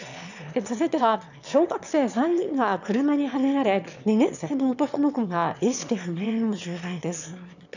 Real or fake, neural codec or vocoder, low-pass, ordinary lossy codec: fake; autoencoder, 22.05 kHz, a latent of 192 numbers a frame, VITS, trained on one speaker; 7.2 kHz; none